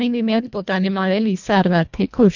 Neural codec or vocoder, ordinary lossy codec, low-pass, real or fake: codec, 24 kHz, 1.5 kbps, HILCodec; none; 7.2 kHz; fake